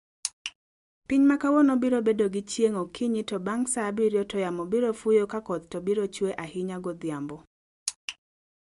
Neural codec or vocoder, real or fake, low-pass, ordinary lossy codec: none; real; 10.8 kHz; MP3, 64 kbps